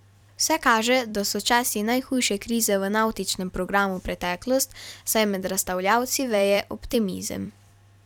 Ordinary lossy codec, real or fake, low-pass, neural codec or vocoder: none; fake; 19.8 kHz; vocoder, 44.1 kHz, 128 mel bands every 256 samples, BigVGAN v2